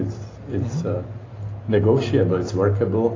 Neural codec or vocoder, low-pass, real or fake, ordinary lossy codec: none; 7.2 kHz; real; AAC, 32 kbps